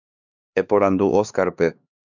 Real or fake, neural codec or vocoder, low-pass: fake; codec, 16 kHz, 4 kbps, X-Codec, HuBERT features, trained on LibriSpeech; 7.2 kHz